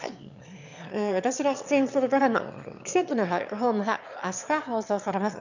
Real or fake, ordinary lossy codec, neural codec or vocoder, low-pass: fake; none; autoencoder, 22.05 kHz, a latent of 192 numbers a frame, VITS, trained on one speaker; 7.2 kHz